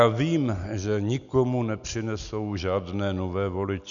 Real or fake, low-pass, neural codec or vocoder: real; 7.2 kHz; none